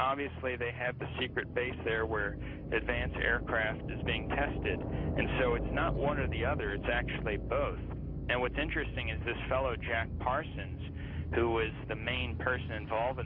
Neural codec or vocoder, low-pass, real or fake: none; 5.4 kHz; real